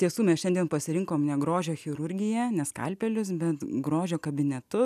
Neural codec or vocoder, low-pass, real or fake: none; 14.4 kHz; real